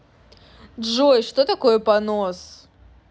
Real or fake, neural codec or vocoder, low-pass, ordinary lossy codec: real; none; none; none